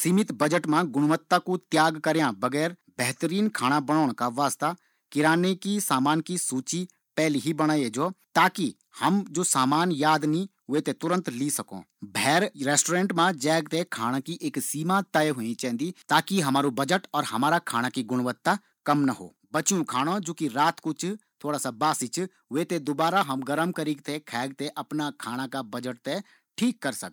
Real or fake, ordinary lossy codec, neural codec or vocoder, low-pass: real; none; none; 19.8 kHz